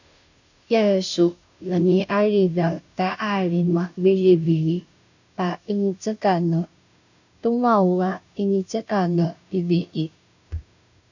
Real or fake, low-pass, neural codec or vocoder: fake; 7.2 kHz; codec, 16 kHz, 0.5 kbps, FunCodec, trained on Chinese and English, 25 frames a second